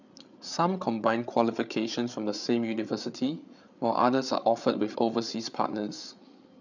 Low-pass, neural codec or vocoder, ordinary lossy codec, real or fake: 7.2 kHz; codec, 16 kHz, 8 kbps, FreqCodec, larger model; none; fake